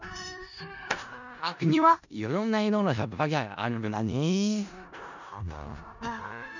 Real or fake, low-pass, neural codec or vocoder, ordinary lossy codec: fake; 7.2 kHz; codec, 16 kHz in and 24 kHz out, 0.4 kbps, LongCat-Audio-Codec, four codebook decoder; none